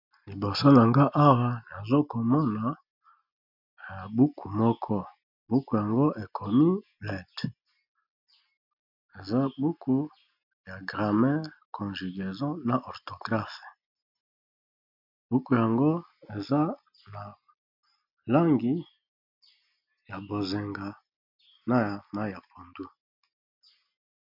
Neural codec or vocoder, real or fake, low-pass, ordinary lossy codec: none; real; 5.4 kHz; MP3, 48 kbps